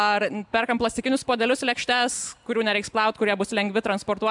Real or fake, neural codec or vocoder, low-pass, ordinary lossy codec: real; none; 10.8 kHz; MP3, 96 kbps